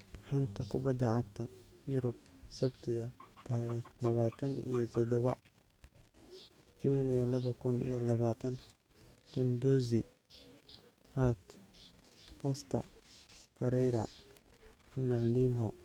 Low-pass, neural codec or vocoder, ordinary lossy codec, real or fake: 19.8 kHz; codec, 44.1 kHz, 2.6 kbps, DAC; MP3, 96 kbps; fake